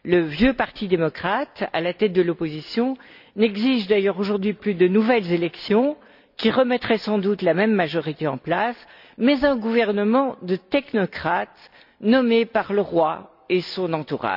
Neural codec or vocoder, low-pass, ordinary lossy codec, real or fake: none; 5.4 kHz; none; real